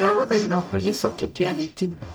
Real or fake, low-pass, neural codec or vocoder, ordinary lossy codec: fake; none; codec, 44.1 kHz, 0.9 kbps, DAC; none